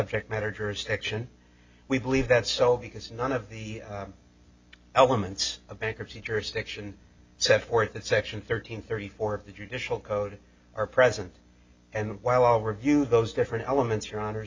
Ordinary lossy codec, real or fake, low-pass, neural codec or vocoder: MP3, 64 kbps; real; 7.2 kHz; none